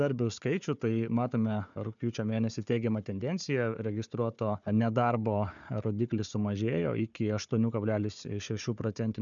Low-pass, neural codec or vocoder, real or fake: 7.2 kHz; codec, 16 kHz, 4 kbps, FunCodec, trained on Chinese and English, 50 frames a second; fake